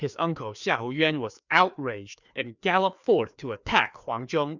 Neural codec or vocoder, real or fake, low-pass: codec, 16 kHz, 2 kbps, FreqCodec, larger model; fake; 7.2 kHz